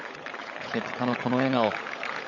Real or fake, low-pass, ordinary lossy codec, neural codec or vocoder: fake; 7.2 kHz; none; codec, 16 kHz, 16 kbps, FunCodec, trained on LibriTTS, 50 frames a second